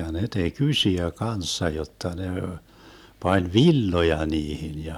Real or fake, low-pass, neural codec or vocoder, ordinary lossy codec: real; 19.8 kHz; none; none